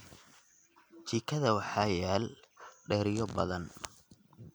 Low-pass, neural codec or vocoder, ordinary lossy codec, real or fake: none; none; none; real